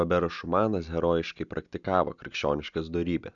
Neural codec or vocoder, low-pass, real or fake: none; 7.2 kHz; real